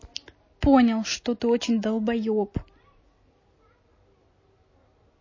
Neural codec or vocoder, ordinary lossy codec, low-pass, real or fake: none; MP3, 32 kbps; 7.2 kHz; real